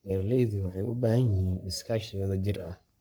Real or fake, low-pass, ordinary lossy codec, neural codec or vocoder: fake; none; none; codec, 44.1 kHz, 3.4 kbps, Pupu-Codec